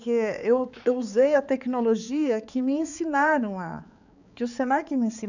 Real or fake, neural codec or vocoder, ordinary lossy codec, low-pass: fake; codec, 16 kHz, 4 kbps, X-Codec, HuBERT features, trained on balanced general audio; none; 7.2 kHz